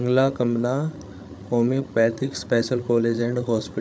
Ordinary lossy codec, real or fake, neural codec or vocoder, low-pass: none; fake; codec, 16 kHz, 8 kbps, FreqCodec, larger model; none